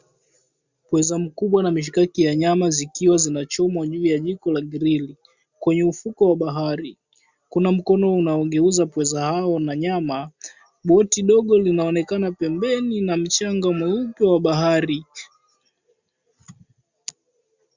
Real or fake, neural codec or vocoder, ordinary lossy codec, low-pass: real; none; Opus, 64 kbps; 7.2 kHz